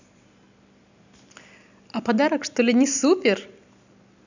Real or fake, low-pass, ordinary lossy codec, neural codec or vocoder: real; 7.2 kHz; none; none